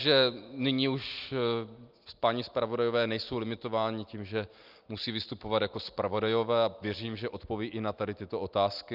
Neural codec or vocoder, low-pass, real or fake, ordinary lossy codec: none; 5.4 kHz; real; Opus, 24 kbps